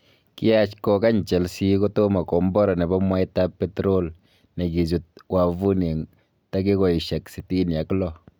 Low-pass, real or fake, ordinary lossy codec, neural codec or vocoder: none; real; none; none